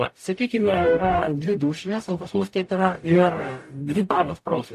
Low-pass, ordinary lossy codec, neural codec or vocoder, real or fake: 14.4 kHz; AAC, 64 kbps; codec, 44.1 kHz, 0.9 kbps, DAC; fake